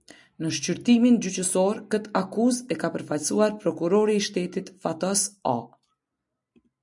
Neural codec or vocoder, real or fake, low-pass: none; real; 10.8 kHz